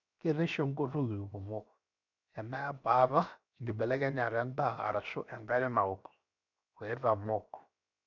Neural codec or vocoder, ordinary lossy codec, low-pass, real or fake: codec, 16 kHz, 0.7 kbps, FocalCodec; none; 7.2 kHz; fake